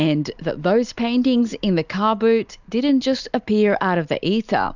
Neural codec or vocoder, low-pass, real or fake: none; 7.2 kHz; real